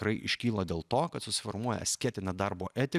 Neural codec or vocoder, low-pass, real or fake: none; 14.4 kHz; real